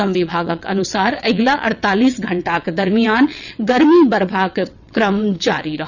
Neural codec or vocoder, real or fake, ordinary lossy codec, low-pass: vocoder, 22.05 kHz, 80 mel bands, WaveNeXt; fake; none; 7.2 kHz